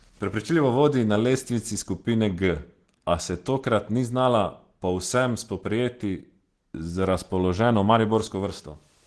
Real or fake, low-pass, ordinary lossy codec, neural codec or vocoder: real; 10.8 kHz; Opus, 16 kbps; none